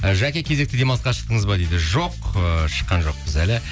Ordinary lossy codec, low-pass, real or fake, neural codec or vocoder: none; none; real; none